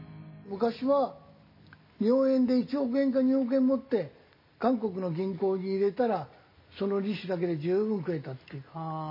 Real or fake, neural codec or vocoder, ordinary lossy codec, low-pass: real; none; MP3, 24 kbps; 5.4 kHz